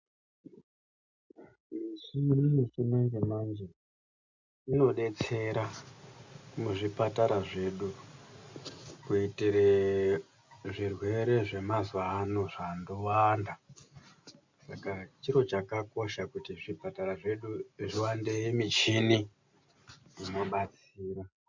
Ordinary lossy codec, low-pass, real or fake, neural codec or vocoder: MP3, 64 kbps; 7.2 kHz; real; none